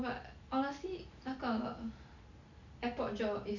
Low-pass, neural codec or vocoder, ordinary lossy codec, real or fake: 7.2 kHz; none; none; real